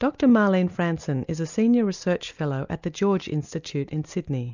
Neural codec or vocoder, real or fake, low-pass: none; real; 7.2 kHz